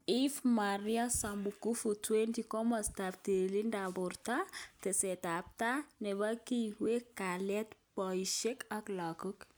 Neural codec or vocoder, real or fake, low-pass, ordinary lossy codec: none; real; none; none